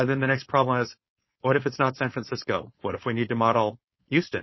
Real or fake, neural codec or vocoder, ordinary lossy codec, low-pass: fake; codec, 16 kHz, 4.8 kbps, FACodec; MP3, 24 kbps; 7.2 kHz